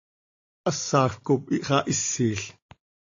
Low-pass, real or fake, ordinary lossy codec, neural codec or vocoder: 7.2 kHz; real; AAC, 48 kbps; none